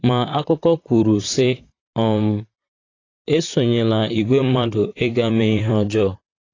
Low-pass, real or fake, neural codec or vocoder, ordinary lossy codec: 7.2 kHz; fake; vocoder, 44.1 kHz, 128 mel bands, Pupu-Vocoder; AAC, 32 kbps